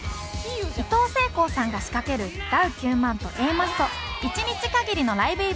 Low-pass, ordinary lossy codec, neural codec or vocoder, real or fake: none; none; none; real